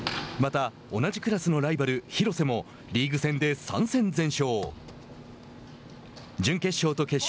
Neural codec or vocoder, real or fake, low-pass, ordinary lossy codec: none; real; none; none